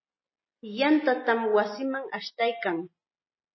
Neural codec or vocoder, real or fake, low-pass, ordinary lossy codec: none; real; 7.2 kHz; MP3, 24 kbps